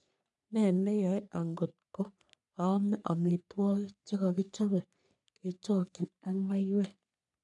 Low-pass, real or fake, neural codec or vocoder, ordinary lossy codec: 10.8 kHz; fake; codec, 44.1 kHz, 3.4 kbps, Pupu-Codec; none